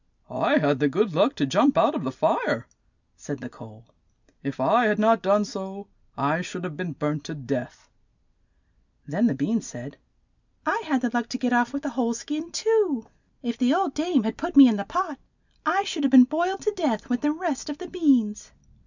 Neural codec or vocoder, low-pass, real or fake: none; 7.2 kHz; real